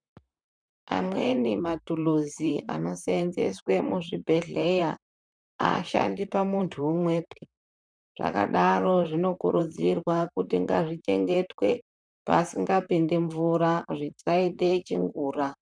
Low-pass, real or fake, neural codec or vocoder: 9.9 kHz; fake; vocoder, 44.1 kHz, 128 mel bands, Pupu-Vocoder